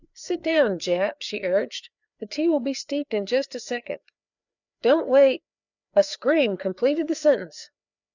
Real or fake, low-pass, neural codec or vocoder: fake; 7.2 kHz; codec, 16 kHz, 4 kbps, FreqCodec, larger model